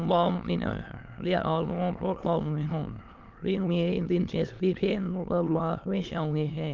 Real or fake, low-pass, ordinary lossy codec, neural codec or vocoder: fake; 7.2 kHz; Opus, 32 kbps; autoencoder, 22.05 kHz, a latent of 192 numbers a frame, VITS, trained on many speakers